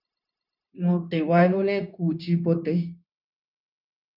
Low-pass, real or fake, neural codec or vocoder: 5.4 kHz; fake; codec, 16 kHz, 0.9 kbps, LongCat-Audio-Codec